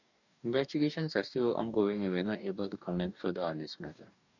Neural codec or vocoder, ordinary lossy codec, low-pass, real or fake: codec, 44.1 kHz, 2.6 kbps, DAC; none; 7.2 kHz; fake